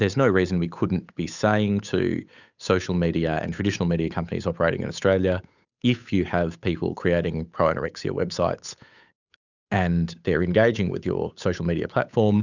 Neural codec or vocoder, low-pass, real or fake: codec, 16 kHz, 8 kbps, FunCodec, trained on Chinese and English, 25 frames a second; 7.2 kHz; fake